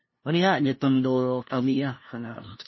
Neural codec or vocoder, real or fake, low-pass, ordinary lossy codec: codec, 16 kHz, 0.5 kbps, FunCodec, trained on LibriTTS, 25 frames a second; fake; 7.2 kHz; MP3, 24 kbps